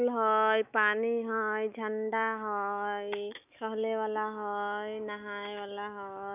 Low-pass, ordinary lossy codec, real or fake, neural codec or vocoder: 3.6 kHz; none; fake; autoencoder, 48 kHz, 128 numbers a frame, DAC-VAE, trained on Japanese speech